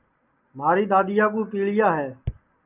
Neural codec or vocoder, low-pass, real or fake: none; 3.6 kHz; real